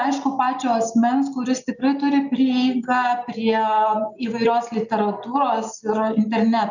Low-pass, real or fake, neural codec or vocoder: 7.2 kHz; real; none